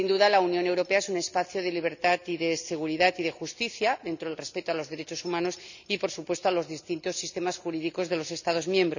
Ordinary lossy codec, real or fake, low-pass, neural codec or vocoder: none; real; 7.2 kHz; none